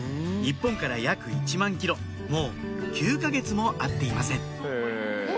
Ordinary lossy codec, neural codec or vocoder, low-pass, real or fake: none; none; none; real